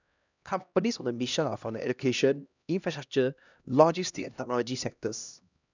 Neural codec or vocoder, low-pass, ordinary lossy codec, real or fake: codec, 16 kHz, 1 kbps, X-Codec, HuBERT features, trained on LibriSpeech; 7.2 kHz; none; fake